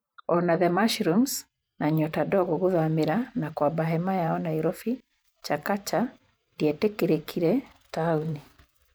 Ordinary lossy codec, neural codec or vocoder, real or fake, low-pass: none; vocoder, 44.1 kHz, 128 mel bands every 512 samples, BigVGAN v2; fake; none